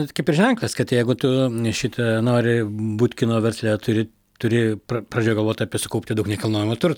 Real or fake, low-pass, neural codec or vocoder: real; 19.8 kHz; none